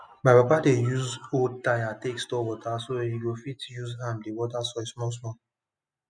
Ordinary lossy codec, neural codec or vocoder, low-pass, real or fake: none; none; 9.9 kHz; real